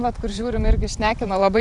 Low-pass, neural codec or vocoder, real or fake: 10.8 kHz; vocoder, 44.1 kHz, 128 mel bands every 512 samples, BigVGAN v2; fake